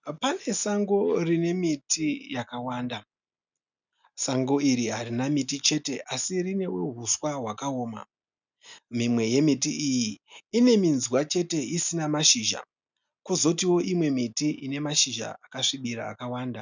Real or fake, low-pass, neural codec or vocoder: real; 7.2 kHz; none